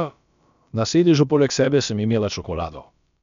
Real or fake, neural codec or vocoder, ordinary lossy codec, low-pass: fake; codec, 16 kHz, about 1 kbps, DyCAST, with the encoder's durations; none; 7.2 kHz